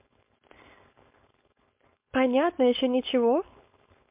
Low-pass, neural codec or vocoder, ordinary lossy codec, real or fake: 3.6 kHz; codec, 16 kHz, 4.8 kbps, FACodec; MP3, 32 kbps; fake